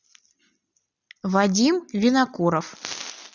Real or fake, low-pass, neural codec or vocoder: real; 7.2 kHz; none